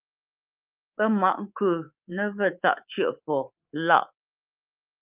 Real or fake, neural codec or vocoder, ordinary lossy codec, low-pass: fake; codec, 24 kHz, 3.1 kbps, DualCodec; Opus, 24 kbps; 3.6 kHz